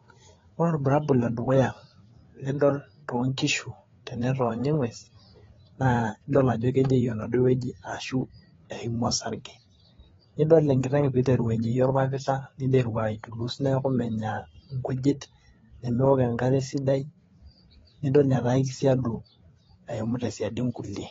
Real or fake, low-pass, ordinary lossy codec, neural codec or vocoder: fake; 7.2 kHz; AAC, 24 kbps; codec, 16 kHz, 4 kbps, FreqCodec, larger model